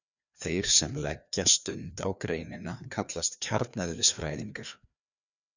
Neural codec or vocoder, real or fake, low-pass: codec, 16 kHz, 2 kbps, FreqCodec, larger model; fake; 7.2 kHz